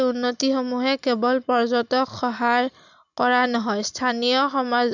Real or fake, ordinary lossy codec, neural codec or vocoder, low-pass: real; none; none; 7.2 kHz